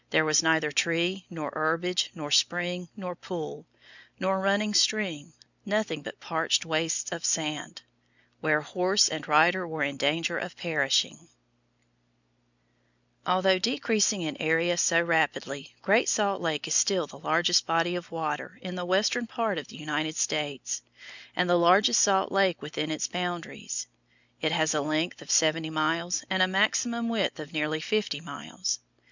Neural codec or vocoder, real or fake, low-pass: none; real; 7.2 kHz